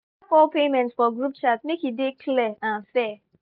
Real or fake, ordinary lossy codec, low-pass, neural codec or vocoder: real; none; 5.4 kHz; none